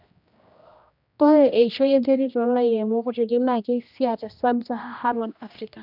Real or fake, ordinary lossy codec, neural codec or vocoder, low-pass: fake; none; codec, 16 kHz, 1 kbps, X-Codec, HuBERT features, trained on general audio; 5.4 kHz